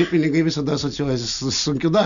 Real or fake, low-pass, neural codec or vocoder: real; 7.2 kHz; none